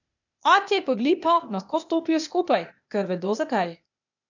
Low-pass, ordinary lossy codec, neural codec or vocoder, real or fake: 7.2 kHz; none; codec, 16 kHz, 0.8 kbps, ZipCodec; fake